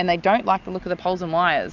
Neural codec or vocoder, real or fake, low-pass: none; real; 7.2 kHz